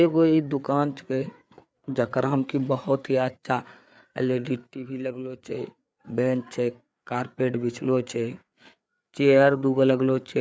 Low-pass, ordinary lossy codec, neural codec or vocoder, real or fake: none; none; codec, 16 kHz, 4 kbps, FreqCodec, larger model; fake